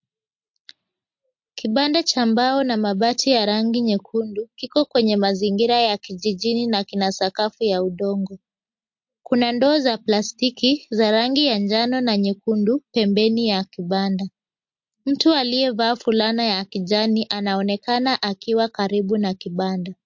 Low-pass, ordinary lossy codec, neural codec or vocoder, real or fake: 7.2 kHz; MP3, 48 kbps; none; real